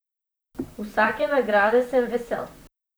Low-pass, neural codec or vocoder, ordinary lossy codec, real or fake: none; vocoder, 44.1 kHz, 128 mel bands, Pupu-Vocoder; none; fake